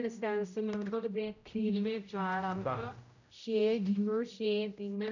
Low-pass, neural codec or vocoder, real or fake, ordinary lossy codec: 7.2 kHz; codec, 16 kHz, 0.5 kbps, X-Codec, HuBERT features, trained on general audio; fake; none